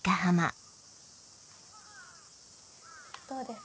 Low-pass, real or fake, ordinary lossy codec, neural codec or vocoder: none; real; none; none